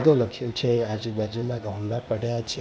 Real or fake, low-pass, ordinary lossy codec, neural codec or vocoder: fake; none; none; codec, 16 kHz, 0.8 kbps, ZipCodec